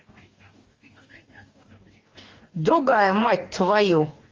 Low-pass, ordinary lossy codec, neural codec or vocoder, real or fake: 7.2 kHz; Opus, 32 kbps; codec, 44.1 kHz, 2.6 kbps, DAC; fake